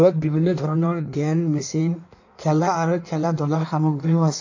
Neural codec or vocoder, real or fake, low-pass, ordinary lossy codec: codec, 16 kHz in and 24 kHz out, 1.1 kbps, FireRedTTS-2 codec; fake; 7.2 kHz; AAC, 48 kbps